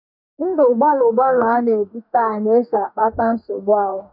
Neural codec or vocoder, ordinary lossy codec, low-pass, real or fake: codec, 44.1 kHz, 2.6 kbps, DAC; none; 5.4 kHz; fake